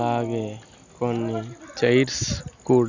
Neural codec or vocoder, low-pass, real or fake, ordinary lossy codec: none; 7.2 kHz; real; Opus, 64 kbps